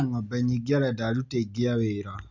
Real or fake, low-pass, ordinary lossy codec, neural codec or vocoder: real; 7.2 kHz; none; none